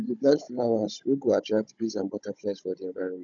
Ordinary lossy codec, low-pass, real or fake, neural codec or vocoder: none; 7.2 kHz; fake; codec, 16 kHz, 16 kbps, FunCodec, trained on Chinese and English, 50 frames a second